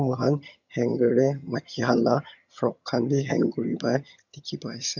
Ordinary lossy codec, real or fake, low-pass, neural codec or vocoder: none; fake; 7.2 kHz; vocoder, 22.05 kHz, 80 mel bands, HiFi-GAN